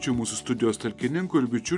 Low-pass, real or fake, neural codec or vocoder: 10.8 kHz; real; none